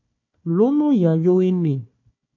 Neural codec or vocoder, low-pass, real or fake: codec, 16 kHz, 1 kbps, FunCodec, trained on Chinese and English, 50 frames a second; 7.2 kHz; fake